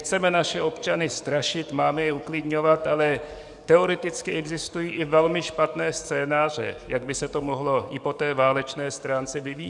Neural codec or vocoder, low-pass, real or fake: codec, 44.1 kHz, 7.8 kbps, DAC; 10.8 kHz; fake